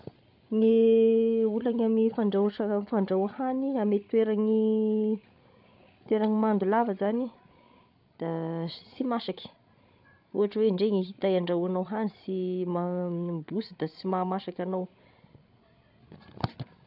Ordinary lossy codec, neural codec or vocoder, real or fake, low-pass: none; codec, 16 kHz, 16 kbps, FreqCodec, larger model; fake; 5.4 kHz